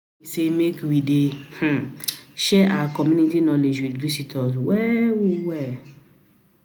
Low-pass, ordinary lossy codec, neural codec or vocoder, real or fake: none; none; none; real